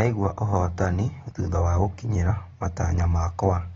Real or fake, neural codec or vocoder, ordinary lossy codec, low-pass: real; none; AAC, 24 kbps; 19.8 kHz